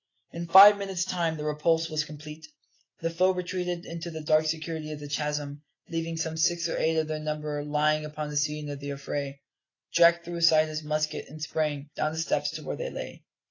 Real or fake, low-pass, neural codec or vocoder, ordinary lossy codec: real; 7.2 kHz; none; AAC, 32 kbps